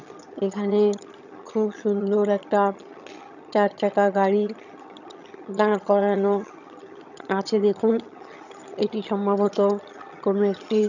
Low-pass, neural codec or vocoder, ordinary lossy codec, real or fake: 7.2 kHz; vocoder, 22.05 kHz, 80 mel bands, HiFi-GAN; none; fake